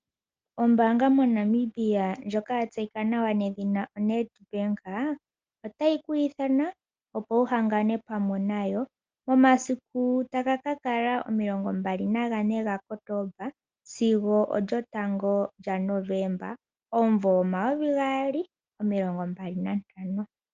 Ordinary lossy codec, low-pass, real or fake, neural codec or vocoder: Opus, 24 kbps; 7.2 kHz; real; none